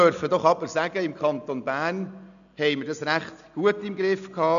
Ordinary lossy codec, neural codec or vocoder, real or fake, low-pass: none; none; real; 7.2 kHz